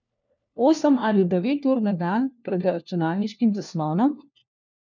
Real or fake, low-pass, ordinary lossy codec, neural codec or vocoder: fake; 7.2 kHz; none; codec, 16 kHz, 1 kbps, FunCodec, trained on LibriTTS, 50 frames a second